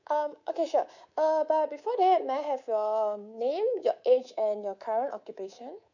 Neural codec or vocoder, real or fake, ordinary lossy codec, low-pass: codec, 24 kHz, 3.1 kbps, DualCodec; fake; none; 7.2 kHz